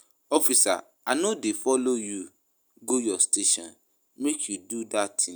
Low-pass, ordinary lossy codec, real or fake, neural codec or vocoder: none; none; real; none